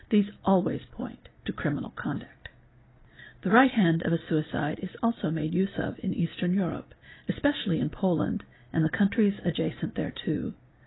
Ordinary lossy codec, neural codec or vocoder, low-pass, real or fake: AAC, 16 kbps; none; 7.2 kHz; real